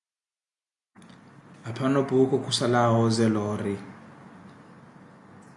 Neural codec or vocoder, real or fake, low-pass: none; real; 10.8 kHz